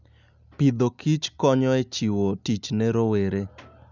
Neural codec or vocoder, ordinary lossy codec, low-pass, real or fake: none; none; 7.2 kHz; real